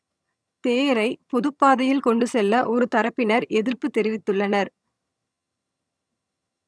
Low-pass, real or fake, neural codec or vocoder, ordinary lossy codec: none; fake; vocoder, 22.05 kHz, 80 mel bands, HiFi-GAN; none